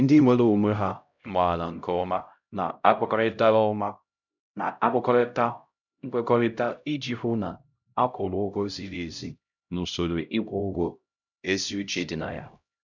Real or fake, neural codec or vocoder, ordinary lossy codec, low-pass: fake; codec, 16 kHz, 0.5 kbps, X-Codec, HuBERT features, trained on LibriSpeech; none; 7.2 kHz